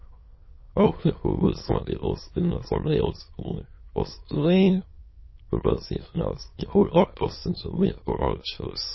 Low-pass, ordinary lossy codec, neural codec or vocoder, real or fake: 7.2 kHz; MP3, 24 kbps; autoencoder, 22.05 kHz, a latent of 192 numbers a frame, VITS, trained on many speakers; fake